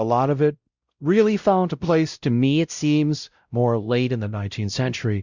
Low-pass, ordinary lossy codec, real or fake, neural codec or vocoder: 7.2 kHz; Opus, 64 kbps; fake; codec, 16 kHz, 0.5 kbps, X-Codec, WavLM features, trained on Multilingual LibriSpeech